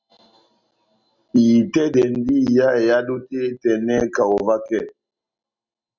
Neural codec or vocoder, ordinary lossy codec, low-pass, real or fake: none; Opus, 64 kbps; 7.2 kHz; real